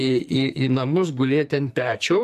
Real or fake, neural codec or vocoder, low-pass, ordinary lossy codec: fake; codec, 44.1 kHz, 2.6 kbps, SNAC; 14.4 kHz; AAC, 96 kbps